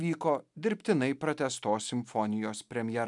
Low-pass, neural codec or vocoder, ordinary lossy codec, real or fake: 10.8 kHz; none; MP3, 96 kbps; real